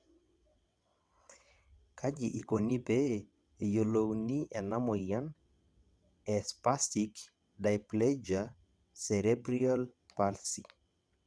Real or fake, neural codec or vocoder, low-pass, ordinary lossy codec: fake; vocoder, 22.05 kHz, 80 mel bands, WaveNeXt; none; none